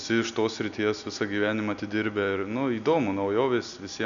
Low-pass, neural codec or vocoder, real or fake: 7.2 kHz; none; real